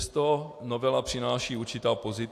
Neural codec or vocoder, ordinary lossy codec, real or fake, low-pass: none; AAC, 64 kbps; real; 14.4 kHz